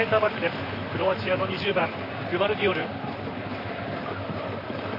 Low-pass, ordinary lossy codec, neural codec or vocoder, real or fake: 5.4 kHz; AAC, 48 kbps; vocoder, 44.1 kHz, 128 mel bands, Pupu-Vocoder; fake